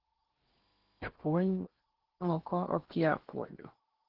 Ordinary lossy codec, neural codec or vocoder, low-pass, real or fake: Opus, 16 kbps; codec, 16 kHz in and 24 kHz out, 0.8 kbps, FocalCodec, streaming, 65536 codes; 5.4 kHz; fake